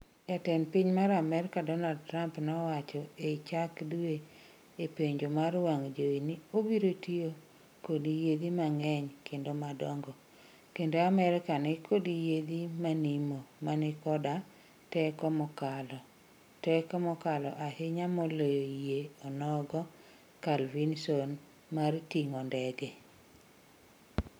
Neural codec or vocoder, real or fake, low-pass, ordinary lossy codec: none; real; none; none